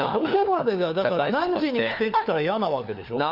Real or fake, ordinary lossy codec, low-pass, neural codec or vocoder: fake; none; 5.4 kHz; codec, 16 kHz, 4 kbps, FunCodec, trained on LibriTTS, 50 frames a second